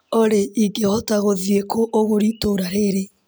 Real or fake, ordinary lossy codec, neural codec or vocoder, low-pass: real; none; none; none